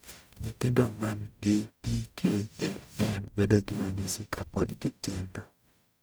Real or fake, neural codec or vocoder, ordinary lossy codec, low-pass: fake; codec, 44.1 kHz, 0.9 kbps, DAC; none; none